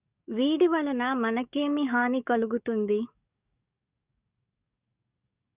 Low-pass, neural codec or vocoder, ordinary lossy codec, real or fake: 3.6 kHz; codec, 44.1 kHz, 7.8 kbps, DAC; Opus, 64 kbps; fake